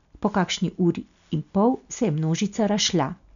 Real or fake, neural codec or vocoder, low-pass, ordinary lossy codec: real; none; 7.2 kHz; none